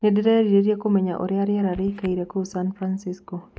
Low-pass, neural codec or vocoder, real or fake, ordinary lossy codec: none; none; real; none